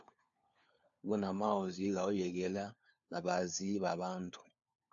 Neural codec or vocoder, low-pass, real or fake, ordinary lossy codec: codec, 16 kHz, 4 kbps, FunCodec, trained on LibriTTS, 50 frames a second; 7.2 kHz; fake; AAC, 64 kbps